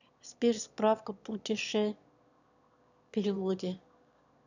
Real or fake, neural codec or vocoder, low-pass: fake; autoencoder, 22.05 kHz, a latent of 192 numbers a frame, VITS, trained on one speaker; 7.2 kHz